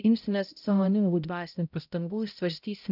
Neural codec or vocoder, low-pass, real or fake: codec, 16 kHz, 0.5 kbps, X-Codec, HuBERT features, trained on balanced general audio; 5.4 kHz; fake